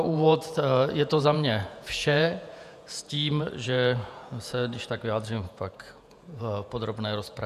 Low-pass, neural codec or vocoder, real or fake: 14.4 kHz; vocoder, 48 kHz, 128 mel bands, Vocos; fake